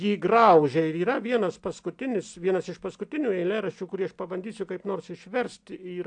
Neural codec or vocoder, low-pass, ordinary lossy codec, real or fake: none; 9.9 kHz; AAC, 64 kbps; real